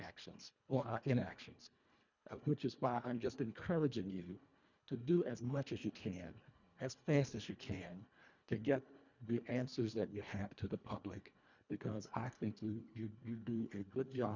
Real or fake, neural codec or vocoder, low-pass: fake; codec, 24 kHz, 1.5 kbps, HILCodec; 7.2 kHz